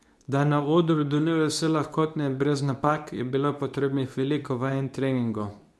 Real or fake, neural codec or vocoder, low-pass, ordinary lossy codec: fake; codec, 24 kHz, 0.9 kbps, WavTokenizer, medium speech release version 2; none; none